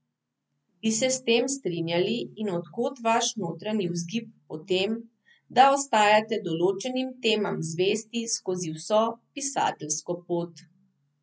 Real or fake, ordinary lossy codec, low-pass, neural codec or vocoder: real; none; none; none